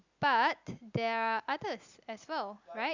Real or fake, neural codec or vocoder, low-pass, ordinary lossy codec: real; none; 7.2 kHz; Opus, 64 kbps